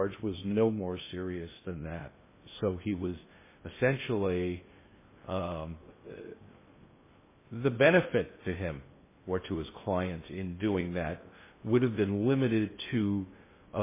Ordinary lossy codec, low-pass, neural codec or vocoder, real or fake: MP3, 16 kbps; 3.6 kHz; codec, 16 kHz in and 24 kHz out, 0.6 kbps, FocalCodec, streaming, 2048 codes; fake